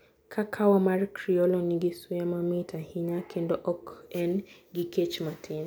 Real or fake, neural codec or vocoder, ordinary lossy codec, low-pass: real; none; none; none